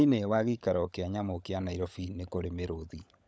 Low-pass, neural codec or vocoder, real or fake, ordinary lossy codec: none; codec, 16 kHz, 16 kbps, FunCodec, trained on LibriTTS, 50 frames a second; fake; none